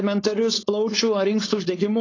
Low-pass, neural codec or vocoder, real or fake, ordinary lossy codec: 7.2 kHz; codec, 16 kHz, 4.8 kbps, FACodec; fake; AAC, 32 kbps